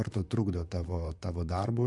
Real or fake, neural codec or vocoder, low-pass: fake; vocoder, 44.1 kHz, 128 mel bands, Pupu-Vocoder; 10.8 kHz